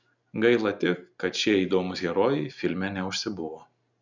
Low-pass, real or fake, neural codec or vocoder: 7.2 kHz; real; none